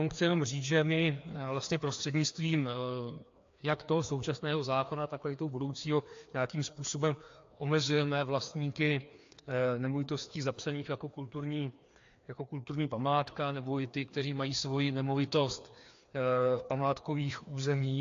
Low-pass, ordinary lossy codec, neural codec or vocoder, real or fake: 7.2 kHz; AAC, 48 kbps; codec, 16 kHz, 2 kbps, FreqCodec, larger model; fake